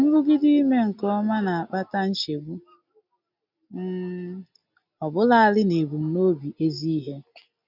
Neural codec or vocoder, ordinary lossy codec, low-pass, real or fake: none; none; 5.4 kHz; real